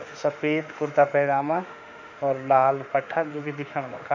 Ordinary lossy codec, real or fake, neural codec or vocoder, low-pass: none; fake; autoencoder, 48 kHz, 32 numbers a frame, DAC-VAE, trained on Japanese speech; 7.2 kHz